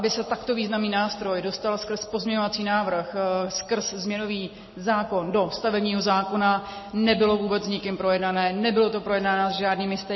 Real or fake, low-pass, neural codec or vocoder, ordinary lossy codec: real; 7.2 kHz; none; MP3, 24 kbps